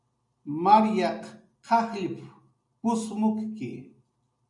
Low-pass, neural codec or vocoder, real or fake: 10.8 kHz; none; real